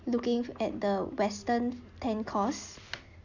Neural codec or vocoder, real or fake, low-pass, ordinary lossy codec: none; real; 7.2 kHz; none